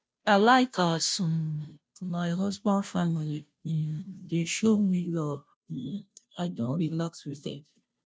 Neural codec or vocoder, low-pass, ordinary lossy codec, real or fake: codec, 16 kHz, 0.5 kbps, FunCodec, trained on Chinese and English, 25 frames a second; none; none; fake